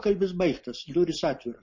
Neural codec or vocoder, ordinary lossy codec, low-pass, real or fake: none; MP3, 32 kbps; 7.2 kHz; real